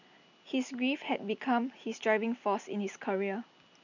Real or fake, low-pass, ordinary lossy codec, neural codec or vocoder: real; 7.2 kHz; none; none